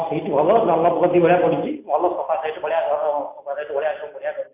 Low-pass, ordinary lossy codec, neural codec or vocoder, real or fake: 3.6 kHz; MP3, 24 kbps; none; real